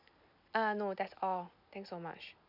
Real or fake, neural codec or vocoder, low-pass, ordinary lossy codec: real; none; 5.4 kHz; none